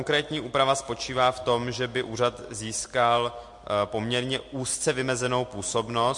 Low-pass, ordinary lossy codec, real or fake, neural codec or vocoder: 10.8 kHz; MP3, 48 kbps; real; none